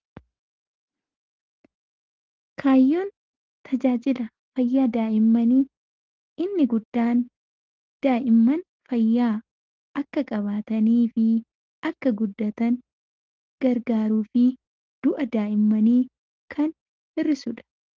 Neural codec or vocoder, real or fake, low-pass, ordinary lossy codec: none; real; 7.2 kHz; Opus, 16 kbps